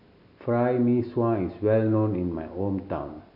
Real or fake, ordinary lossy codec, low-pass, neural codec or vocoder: real; none; 5.4 kHz; none